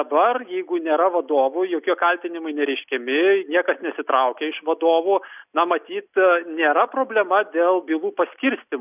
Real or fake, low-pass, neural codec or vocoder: real; 3.6 kHz; none